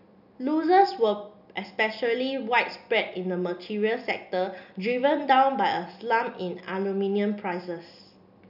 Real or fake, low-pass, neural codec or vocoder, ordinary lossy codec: real; 5.4 kHz; none; none